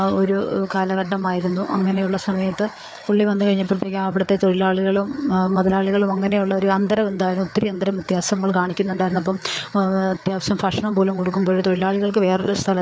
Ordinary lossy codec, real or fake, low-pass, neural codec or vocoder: none; fake; none; codec, 16 kHz, 4 kbps, FreqCodec, larger model